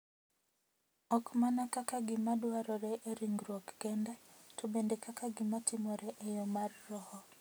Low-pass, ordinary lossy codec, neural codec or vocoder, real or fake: none; none; none; real